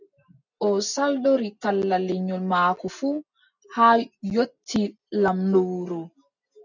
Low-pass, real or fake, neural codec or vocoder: 7.2 kHz; real; none